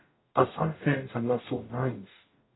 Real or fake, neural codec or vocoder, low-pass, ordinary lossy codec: fake; codec, 44.1 kHz, 0.9 kbps, DAC; 7.2 kHz; AAC, 16 kbps